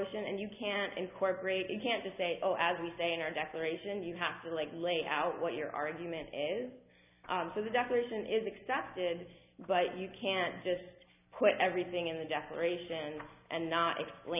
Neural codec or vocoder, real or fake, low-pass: none; real; 3.6 kHz